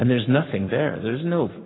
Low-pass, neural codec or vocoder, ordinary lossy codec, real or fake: 7.2 kHz; codec, 44.1 kHz, 7.8 kbps, Pupu-Codec; AAC, 16 kbps; fake